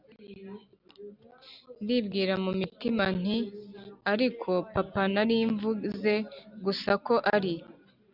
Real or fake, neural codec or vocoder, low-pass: real; none; 5.4 kHz